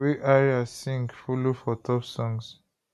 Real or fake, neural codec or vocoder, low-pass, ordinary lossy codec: real; none; 14.4 kHz; none